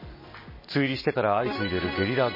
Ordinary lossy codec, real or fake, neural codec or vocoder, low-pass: MP3, 24 kbps; real; none; 5.4 kHz